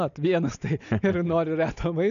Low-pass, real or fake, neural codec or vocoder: 7.2 kHz; real; none